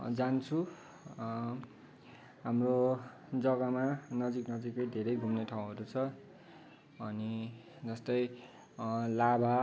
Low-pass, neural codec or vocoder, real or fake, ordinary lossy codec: none; none; real; none